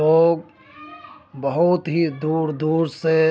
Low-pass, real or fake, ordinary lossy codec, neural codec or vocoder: none; real; none; none